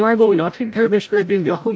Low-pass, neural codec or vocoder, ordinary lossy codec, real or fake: none; codec, 16 kHz, 0.5 kbps, FreqCodec, larger model; none; fake